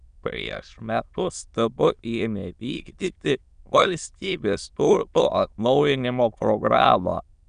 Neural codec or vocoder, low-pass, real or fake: autoencoder, 22.05 kHz, a latent of 192 numbers a frame, VITS, trained on many speakers; 9.9 kHz; fake